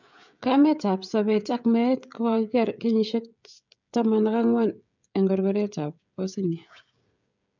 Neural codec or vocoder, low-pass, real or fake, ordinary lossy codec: codec, 16 kHz, 16 kbps, FreqCodec, smaller model; 7.2 kHz; fake; none